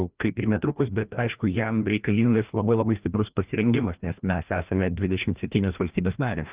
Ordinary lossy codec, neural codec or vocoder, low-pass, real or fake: Opus, 32 kbps; codec, 16 kHz, 1 kbps, FreqCodec, larger model; 3.6 kHz; fake